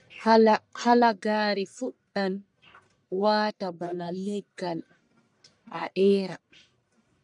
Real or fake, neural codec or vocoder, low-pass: fake; codec, 44.1 kHz, 1.7 kbps, Pupu-Codec; 10.8 kHz